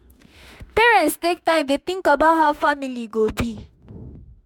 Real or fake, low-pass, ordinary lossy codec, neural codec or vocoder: fake; 19.8 kHz; MP3, 96 kbps; autoencoder, 48 kHz, 32 numbers a frame, DAC-VAE, trained on Japanese speech